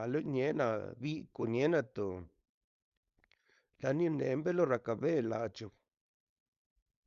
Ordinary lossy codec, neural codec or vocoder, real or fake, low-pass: Opus, 64 kbps; codec, 16 kHz, 4.8 kbps, FACodec; fake; 7.2 kHz